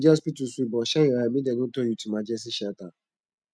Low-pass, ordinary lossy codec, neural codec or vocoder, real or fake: none; none; none; real